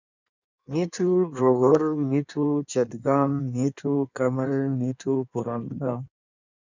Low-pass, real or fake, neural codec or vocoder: 7.2 kHz; fake; codec, 16 kHz in and 24 kHz out, 1.1 kbps, FireRedTTS-2 codec